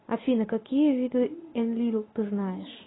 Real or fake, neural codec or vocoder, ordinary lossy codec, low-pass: real; none; AAC, 16 kbps; 7.2 kHz